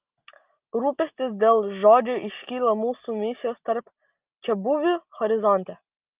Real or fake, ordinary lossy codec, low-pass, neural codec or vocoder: real; Opus, 24 kbps; 3.6 kHz; none